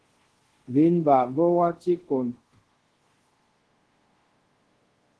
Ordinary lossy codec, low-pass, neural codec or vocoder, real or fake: Opus, 16 kbps; 10.8 kHz; codec, 24 kHz, 0.5 kbps, DualCodec; fake